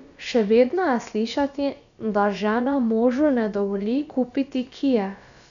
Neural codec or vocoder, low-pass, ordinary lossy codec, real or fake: codec, 16 kHz, about 1 kbps, DyCAST, with the encoder's durations; 7.2 kHz; none; fake